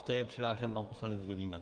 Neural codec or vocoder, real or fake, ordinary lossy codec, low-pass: codec, 24 kHz, 1 kbps, SNAC; fake; Opus, 32 kbps; 9.9 kHz